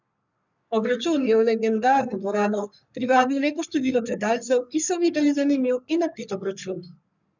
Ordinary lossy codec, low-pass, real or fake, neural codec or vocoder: none; 7.2 kHz; fake; codec, 44.1 kHz, 3.4 kbps, Pupu-Codec